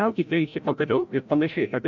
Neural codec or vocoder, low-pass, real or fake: codec, 16 kHz, 0.5 kbps, FreqCodec, larger model; 7.2 kHz; fake